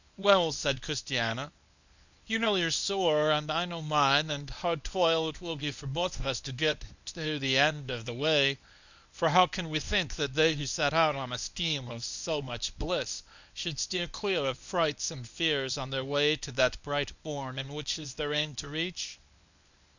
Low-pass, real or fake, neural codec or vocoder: 7.2 kHz; fake; codec, 24 kHz, 0.9 kbps, WavTokenizer, medium speech release version 1